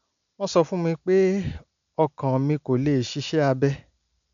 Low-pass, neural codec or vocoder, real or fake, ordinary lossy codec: 7.2 kHz; none; real; none